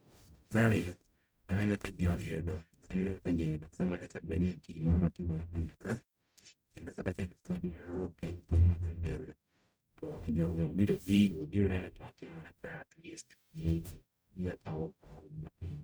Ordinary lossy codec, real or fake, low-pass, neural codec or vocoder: none; fake; none; codec, 44.1 kHz, 0.9 kbps, DAC